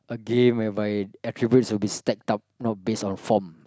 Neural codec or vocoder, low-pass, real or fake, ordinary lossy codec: none; none; real; none